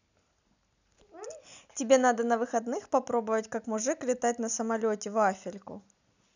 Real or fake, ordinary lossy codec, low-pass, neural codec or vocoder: real; none; 7.2 kHz; none